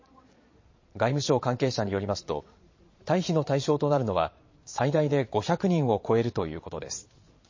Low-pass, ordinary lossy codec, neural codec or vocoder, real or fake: 7.2 kHz; MP3, 32 kbps; vocoder, 22.05 kHz, 80 mel bands, Vocos; fake